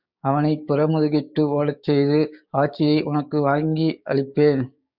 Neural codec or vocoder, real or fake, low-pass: codec, 16 kHz, 6 kbps, DAC; fake; 5.4 kHz